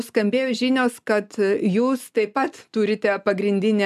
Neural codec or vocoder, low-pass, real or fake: none; 14.4 kHz; real